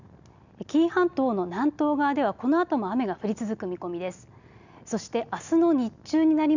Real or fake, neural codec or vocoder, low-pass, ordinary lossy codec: real; none; 7.2 kHz; none